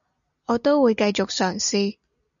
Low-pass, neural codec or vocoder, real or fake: 7.2 kHz; none; real